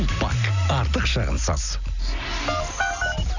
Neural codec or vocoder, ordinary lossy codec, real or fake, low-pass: none; none; real; 7.2 kHz